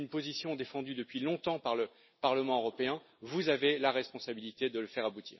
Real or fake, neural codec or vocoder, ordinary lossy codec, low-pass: real; none; MP3, 24 kbps; 7.2 kHz